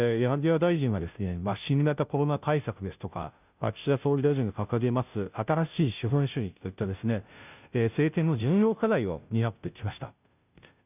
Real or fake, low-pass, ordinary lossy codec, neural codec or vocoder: fake; 3.6 kHz; none; codec, 16 kHz, 0.5 kbps, FunCodec, trained on Chinese and English, 25 frames a second